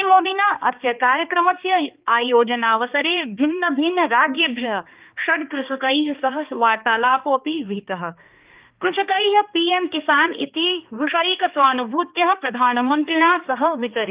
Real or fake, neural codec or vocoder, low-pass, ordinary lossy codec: fake; codec, 16 kHz, 2 kbps, X-Codec, HuBERT features, trained on balanced general audio; 3.6 kHz; Opus, 32 kbps